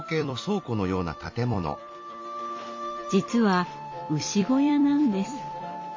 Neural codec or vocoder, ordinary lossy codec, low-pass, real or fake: vocoder, 44.1 kHz, 128 mel bands every 256 samples, BigVGAN v2; MP3, 32 kbps; 7.2 kHz; fake